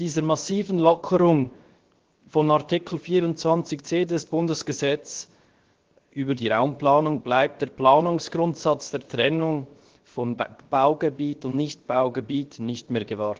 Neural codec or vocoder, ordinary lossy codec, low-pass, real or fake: codec, 16 kHz, 0.7 kbps, FocalCodec; Opus, 16 kbps; 7.2 kHz; fake